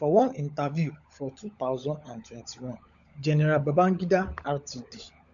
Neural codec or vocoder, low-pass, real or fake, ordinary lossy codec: codec, 16 kHz, 16 kbps, FunCodec, trained on LibriTTS, 50 frames a second; 7.2 kHz; fake; none